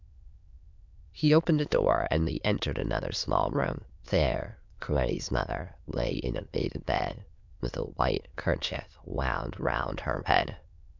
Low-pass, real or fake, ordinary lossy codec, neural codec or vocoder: 7.2 kHz; fake; MP3, 64 kbps; autoencoder, 22.05 kHz, a latent of 192 numbers a frame, VITS, trained on many speakers